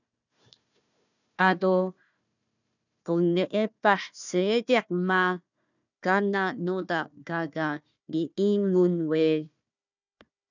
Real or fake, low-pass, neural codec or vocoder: fake; 7.2 kHz; codec, 16 kHz, 1 kbps, FunCodec, trained on Chinese and English, 50 frames a second